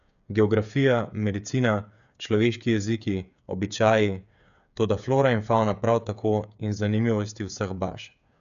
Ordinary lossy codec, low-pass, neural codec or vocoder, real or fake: none; 7.2 kHz; codec, 16 kHz, 8 kbps, FreqCodec, smaller model; fake